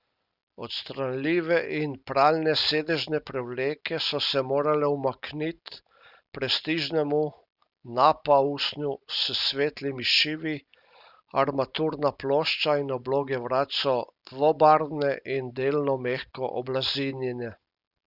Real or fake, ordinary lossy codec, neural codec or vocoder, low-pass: real; Opus, 64 kbps; none; 5.4 kHz